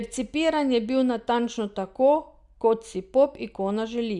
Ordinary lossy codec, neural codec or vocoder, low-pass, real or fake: Opus, 64 kbps; none; 10.8 kHz; real